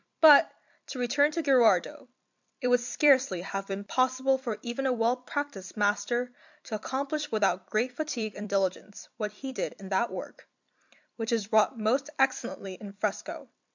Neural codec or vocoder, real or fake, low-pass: vocoder, 44.1 kHz, 128 mel bands every 256 samples, BigVGAN v2; fake; 7.2 kHz